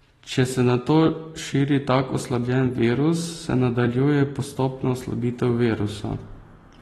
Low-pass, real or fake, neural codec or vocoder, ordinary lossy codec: 19.8 kHz; real; none; AAC, 32 kbps